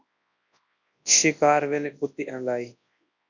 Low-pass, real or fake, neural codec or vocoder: 7.2 kHz; fake; codec, 24 kHz, 0.9 kbps, WavTokenizer, large speech release